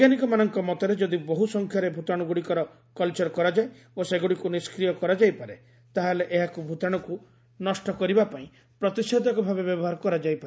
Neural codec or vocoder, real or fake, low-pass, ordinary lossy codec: none; real; none; none